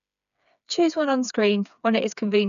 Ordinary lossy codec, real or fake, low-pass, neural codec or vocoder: none; fake; 7.2 kHz; codec, 16 kHz, 4 kbps, FreqCodec, smaller model